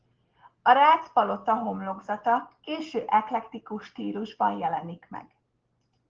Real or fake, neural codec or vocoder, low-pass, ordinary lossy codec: real; none; 7.2 kHz; Opus, 16 kbps